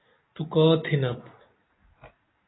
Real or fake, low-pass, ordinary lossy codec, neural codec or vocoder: real; 7.2 kHz; AAC, 16 kbps; none